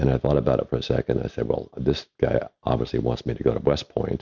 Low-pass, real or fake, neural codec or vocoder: 7.2 kHz; real; none